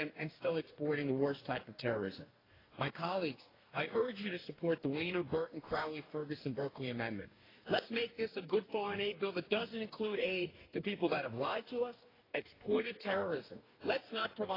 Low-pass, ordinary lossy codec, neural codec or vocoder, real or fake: 5.4 kHz; AAC, 24 kbps; codec, 44.1 kHz, 2.6 kbps, DAC; fake